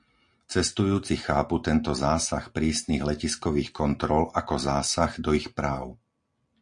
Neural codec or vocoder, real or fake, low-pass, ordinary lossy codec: none; real; 9.9 kHz; MP3, 48 kbps